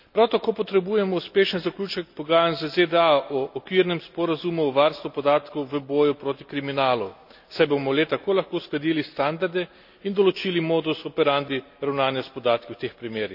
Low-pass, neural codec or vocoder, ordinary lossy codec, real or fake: 5.4 kHz; none; none; real